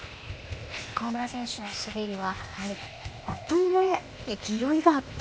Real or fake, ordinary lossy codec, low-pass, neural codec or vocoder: fake; none; none; codec, 16 kHz, 0.8 kbps, ZipCodec